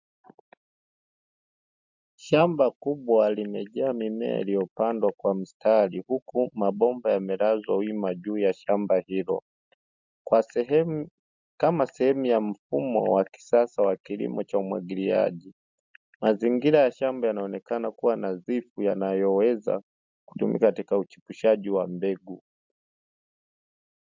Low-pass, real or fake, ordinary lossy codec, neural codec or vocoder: 7.2 kHz; real; MP3, 64 kbps; none